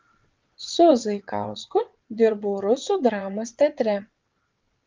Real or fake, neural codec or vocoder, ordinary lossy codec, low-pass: fake; vocoder, 22.05 kHz, 80 mel bands, WaveNeXt; Opus, 24 kbps; 7.2 kHz